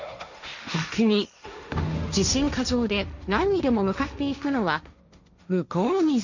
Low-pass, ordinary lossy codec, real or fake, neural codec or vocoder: 7.2 kHz; none; fake; codec, 16 kHz, 1.1 kbps, Voila-Tokenizer